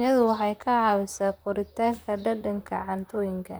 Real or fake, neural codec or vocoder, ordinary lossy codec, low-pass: fake; vocoder, 44.1 kHz, 128 mel bands, Pupu-Vocoder; none; none